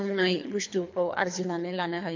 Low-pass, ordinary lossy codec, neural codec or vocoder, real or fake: 7.2 kHz; MP3, 48 kbps; codec, 24 kHz, 3 kbps, HILCodec; fake